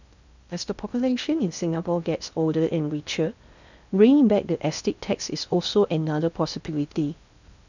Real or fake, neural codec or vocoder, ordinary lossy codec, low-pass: fake; codec, 16 kHz in and 24 kHz out, 0.6 kbps, FocalCodec, streaming, 2048 codes; none; 7.2 kHz